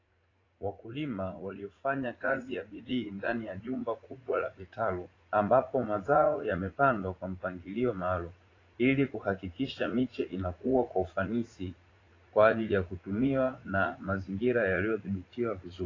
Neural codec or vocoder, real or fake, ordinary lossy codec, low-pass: vocoder, 44.1 kHz, 80 mel bands, Vocos; fake; AAC, 32 kbps; 7.2 kHz